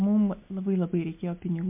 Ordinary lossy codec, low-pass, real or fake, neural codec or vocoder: MP3, 32 kbps; 3.6 kHz; real; none